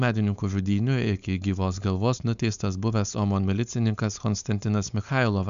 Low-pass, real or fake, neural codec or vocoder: 7.2 kHz; fake; codec, 16 kHz, 4.8 kbps, FACodec